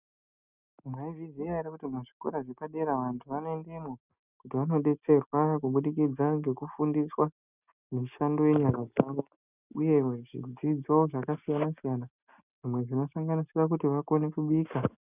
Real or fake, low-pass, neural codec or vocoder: real; 3.6 kHz; none